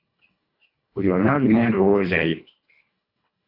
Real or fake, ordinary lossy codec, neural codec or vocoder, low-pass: fake; AAC, 32 kbps; codec, 24 kHz, 1.5 kbps, HILCodec; 5.4 kHz